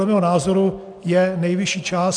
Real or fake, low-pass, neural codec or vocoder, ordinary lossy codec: real; 9.9 kHz; none; MP3, 96 kbps